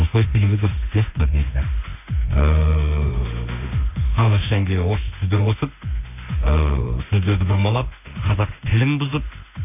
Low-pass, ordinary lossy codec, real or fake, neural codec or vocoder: 3.6 kHz; none; fake; autoencoder, 48 kHz, 32 numbers a frame, DAC-VAE, trained on Japanese speech